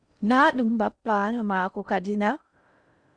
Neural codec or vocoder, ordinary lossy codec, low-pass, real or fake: codec, 16 kHz in and 24 kHz out, 0.6 kbps, FocalCodec, streaming, 4096 codes; Opus, 64 kbps; 9.9 kHz; fake